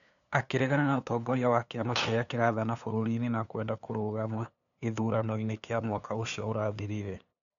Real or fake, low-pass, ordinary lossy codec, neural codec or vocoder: fake; 7.2 kHz; none; codec, 16 kHz, 2 kbps, FunCodec, trained on LibriTTS, 25 frames a second